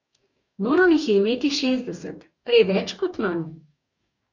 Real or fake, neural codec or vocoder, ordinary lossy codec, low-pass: fake; codec, 44.1 kHz, 2.6 kbps, DAC; none; 7.2 kHz